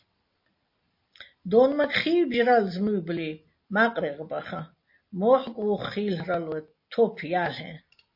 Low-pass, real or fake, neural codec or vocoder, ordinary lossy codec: 5.4 kHz; real; none; MP3, 32 kbps